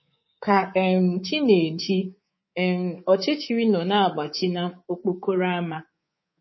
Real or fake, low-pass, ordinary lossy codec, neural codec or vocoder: fake; 7.2 kHz; MP3, 24 kbps; codec, 24 kHz, 3.1 kbps, DualCodec